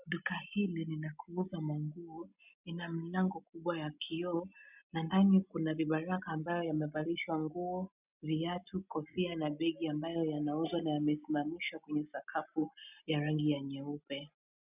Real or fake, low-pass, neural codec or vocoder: real; 3.6 kHz; none